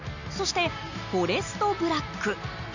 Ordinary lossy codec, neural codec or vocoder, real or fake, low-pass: none; none; real; 7.2 kHz